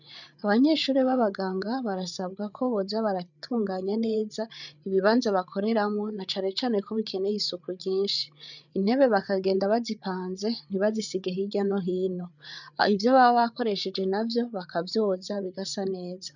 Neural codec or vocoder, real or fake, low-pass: codec, 16 kHz, 8 kbps, FreqCodec, larger model; fake; 7.2 kHz